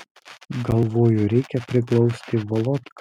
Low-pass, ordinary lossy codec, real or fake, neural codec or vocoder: 19.8 kHz; MP3, 96 kbps; real; none